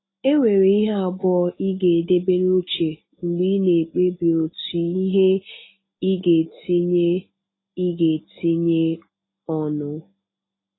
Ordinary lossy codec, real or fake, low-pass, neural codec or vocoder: AAC, 16 kbps; real; 7.2 kHz; none